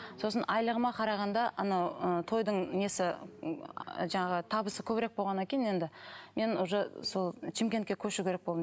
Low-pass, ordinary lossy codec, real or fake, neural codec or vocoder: none; none; real; none